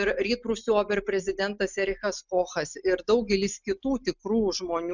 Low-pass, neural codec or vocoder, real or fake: 7.2 kHz; none; real